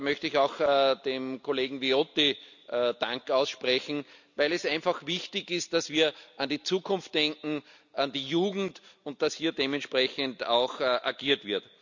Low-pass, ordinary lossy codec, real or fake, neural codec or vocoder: 7.2 kHz; none; real; none